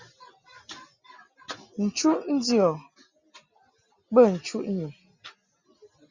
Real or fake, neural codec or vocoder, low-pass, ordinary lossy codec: real; none; 7.2 kHz; Opus, 64 kbps